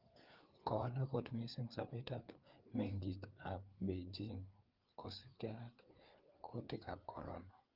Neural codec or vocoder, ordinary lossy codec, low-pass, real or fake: codec, 16 kHz, 4 kbps, FreqCodec, larger model; Opus, 32 kbps; 5.4 kHz; fake